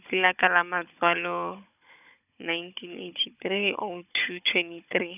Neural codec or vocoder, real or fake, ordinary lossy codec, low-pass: codec, 16 kHz, 16 kbps, FunCodec, trained on Chinese and English, 50 frames a second; fake; none; 3.6 kHz